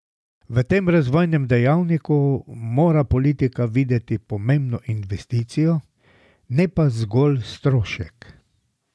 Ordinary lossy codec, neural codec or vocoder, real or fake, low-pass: none; none; real; none